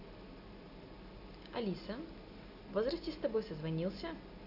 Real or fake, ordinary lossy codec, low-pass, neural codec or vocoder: real; none; 5.4 kHz; none